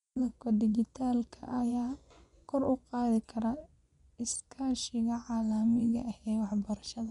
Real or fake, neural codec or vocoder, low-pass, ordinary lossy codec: fake; vocoder, 24 kHz, 100 mel bands, Vocos; 10.8 kHz; none